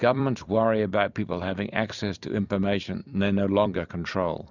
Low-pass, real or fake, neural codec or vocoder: 7.2 kHz; fake; vocoder, 44.1 kHz, 128 mel bands every 256 samples, BigVGAN v2